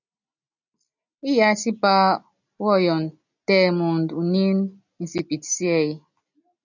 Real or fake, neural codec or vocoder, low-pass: real; none; 7.2 kHz